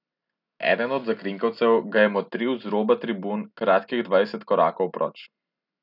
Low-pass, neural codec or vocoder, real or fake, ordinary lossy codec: 5.4 kHz; none; real; none